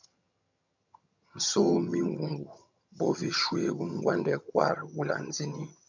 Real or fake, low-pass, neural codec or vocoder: fake; 7.2 kHz; vocoder, 22.05 kHz, 80 mel bands, HiFi-GAN